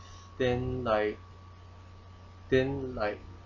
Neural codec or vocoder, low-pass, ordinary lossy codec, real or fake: none; 7.2 kHz; none; real